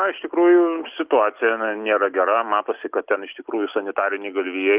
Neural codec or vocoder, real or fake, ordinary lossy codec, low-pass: none; real; Opus, 24 kbps; 3.6 kHz